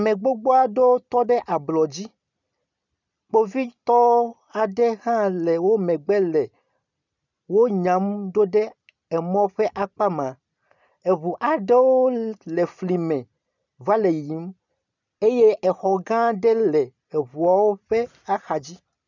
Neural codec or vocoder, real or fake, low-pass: none; real; 7.2 kHz